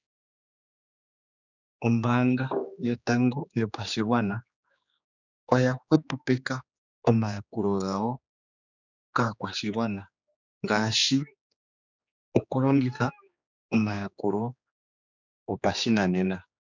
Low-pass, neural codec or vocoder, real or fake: 7.2 kHz; codec, 16 kHz, 2 kbps, X-Codec, HuBERT features, trained on general audio; fake